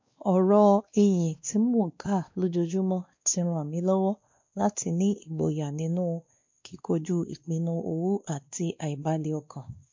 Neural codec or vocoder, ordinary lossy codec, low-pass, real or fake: codec, 16 kHz, 2 kbps, X-Codec, WavLM features, trained on Multilingual LibriSpeech; MP3, 48 kbps; 7.2 kHz; fake